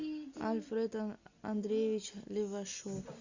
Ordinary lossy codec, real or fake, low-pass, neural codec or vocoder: Opus, 64 kbps; real; 7.2 kHz; none